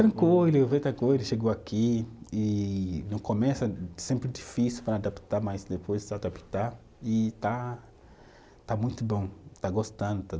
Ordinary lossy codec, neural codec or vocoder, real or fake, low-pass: none; none; real; none